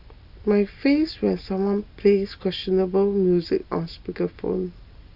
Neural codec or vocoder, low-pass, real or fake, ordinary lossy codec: none; 5.4 kHz; real; Opus, 64 kbps